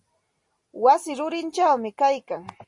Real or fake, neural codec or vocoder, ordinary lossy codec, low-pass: real; none; MP3, 48 kbps; 10.8 kHz